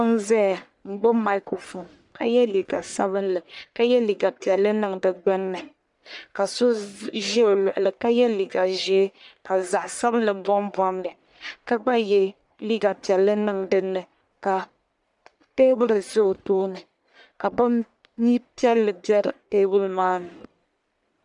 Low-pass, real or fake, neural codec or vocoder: 10.8 kHz; fake; codec, 44.1 kHz, 1.7 kbps, Pupu-Codec